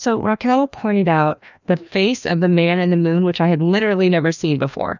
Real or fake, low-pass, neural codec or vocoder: fake; 7.2 kHz; codec, 16 kHz, 1 kbps, FreqCodec, larger model